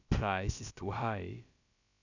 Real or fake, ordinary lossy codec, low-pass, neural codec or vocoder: fake; none; 7.2 kHz; codec, 16 kHz, about 1 kbps, DyCAST, with the encoder's durations